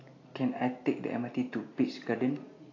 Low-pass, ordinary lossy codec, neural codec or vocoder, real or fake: 7.2 kHz; AAC, 32 kbps; none; real